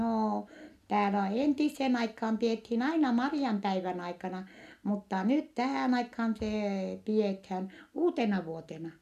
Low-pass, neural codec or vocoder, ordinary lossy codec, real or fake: 14.4 kHz; none; none; real